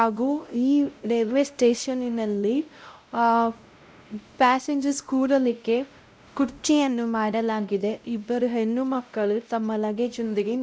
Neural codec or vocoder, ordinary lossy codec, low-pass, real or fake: codec, 16 kHz, 0.5 kbps, X-Codec, WavLM features, trained on Multilingual LibriSpeech; none; none; fake